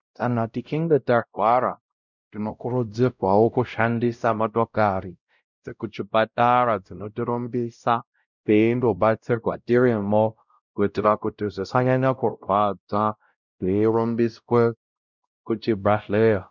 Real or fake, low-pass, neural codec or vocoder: fake; 7.2 kHz; codec, 16 kHz, 0.5 kbps, X-Codec, WavLM features, trained on Multilingual LibriSpeech